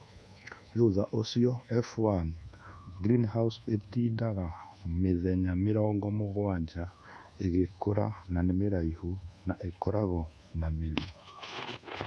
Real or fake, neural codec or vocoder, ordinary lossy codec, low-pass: fake; codec, 24 kHz, 1.2 kbps, DualCodec; none; none